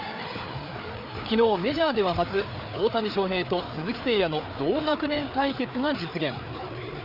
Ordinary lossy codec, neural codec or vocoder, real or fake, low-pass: none; codec, 16 kHz, 4 kbps, FreqCodec, larger model; fake; 5.4 kHz